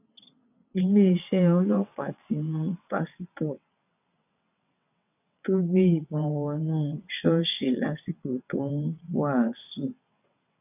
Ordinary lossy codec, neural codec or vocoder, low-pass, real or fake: none; vocoder, 22.05 kHz, 80 mel bands, WaveNeXt; 3.6 kHz; fake